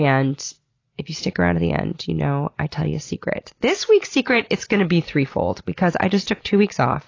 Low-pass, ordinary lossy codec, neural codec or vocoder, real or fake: 7.2 kHz; AAC, 32 kbps; none; real